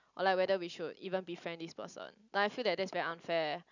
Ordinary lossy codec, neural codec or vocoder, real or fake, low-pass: none; none; real; 7.2 kHz